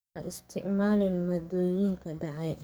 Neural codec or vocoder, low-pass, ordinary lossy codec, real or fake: codec, 44.1 kHz, 2.6 kbps, SNAC; none; none; fake